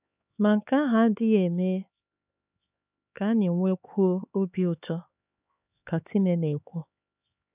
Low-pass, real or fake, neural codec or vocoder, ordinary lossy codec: 3.6 kHz; fake; codec, 16 kHz, 4 kbps, X-Codec, HuBERT features, trained on LibriSpeech; none